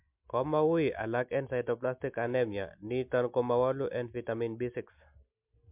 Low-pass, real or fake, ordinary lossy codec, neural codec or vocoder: 3.6 kHz; real; MP3, 32 kbps; none